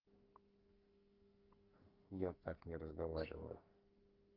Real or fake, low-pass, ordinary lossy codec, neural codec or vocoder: fake; 5.4 kHz; Opus, 32 kbps; codec, 44.1 kHz, 2.6 kbps, SNAC